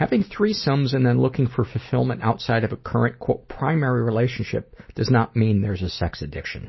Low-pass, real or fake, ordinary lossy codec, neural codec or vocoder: 7.2 kHz; real; MP3, 24 kbps; none